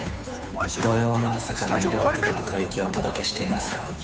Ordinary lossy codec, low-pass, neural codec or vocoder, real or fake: none; none; codec, 16 kHz, 2 kbps, FunCodec, trained on Chinese and English, 25 frames a second; fake